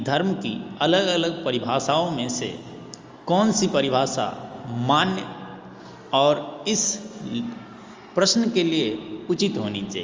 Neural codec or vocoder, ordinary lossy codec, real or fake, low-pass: none; Opus, 32 kbps; real; 7.2 kHz